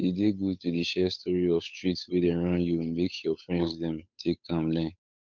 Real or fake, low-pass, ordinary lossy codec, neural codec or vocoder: fake; 7.2 kHz; MP3, 64 kbps; codec, 16 kHz, 8 kbps, FunCodec, trained on Chinese and English, 25 frames a second